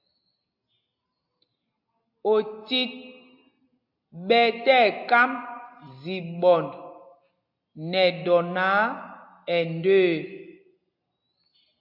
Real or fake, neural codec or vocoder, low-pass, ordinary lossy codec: real; none; 5.4 kHz; AAC, 48 kbps